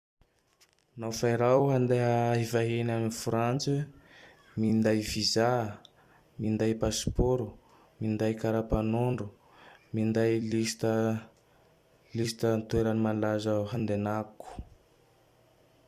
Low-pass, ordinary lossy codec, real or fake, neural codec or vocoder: 14.4 kHz; Opus, 64 kbps; fake; vocoder, 44.1 kHz, 128 mel bands every 256 samples, BigVGAN v2